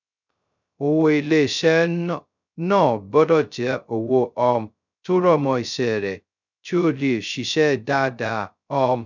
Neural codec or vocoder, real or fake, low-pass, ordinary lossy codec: codec, 16 kHz, 0.2 kbps, FocalCodec; fake; 7.2 kHz; none